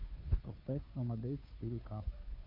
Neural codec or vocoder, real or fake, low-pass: codec, 16 kHz, 2 kbps, FunCodec, trained on Chinese and English, 25 frames a second; fake; 5.4 kHz